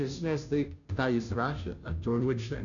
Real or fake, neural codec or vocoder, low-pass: fake; codec, 16 kHz, 0.5 kbps, FunCodec, trained on Chinese and English, 25 frames a second; 7.2 kHz